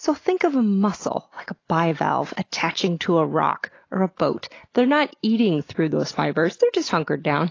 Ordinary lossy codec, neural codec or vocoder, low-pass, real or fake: AAC, 32 kbps; none; 7.2 kHz; real